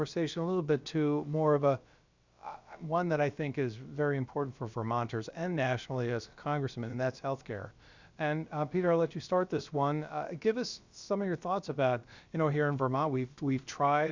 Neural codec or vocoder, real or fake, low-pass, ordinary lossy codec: codec, 16 kHz, about 1 kbps, DyCAST, with the encoder's durations; fake; 7.2 kHz; Opus, 64 kbps